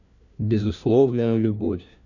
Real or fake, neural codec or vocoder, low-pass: fake; codec, 16 kHz, 1 kbps, FunCodec, trained on LibriTTS, 50 frames a second; 7.2 kHz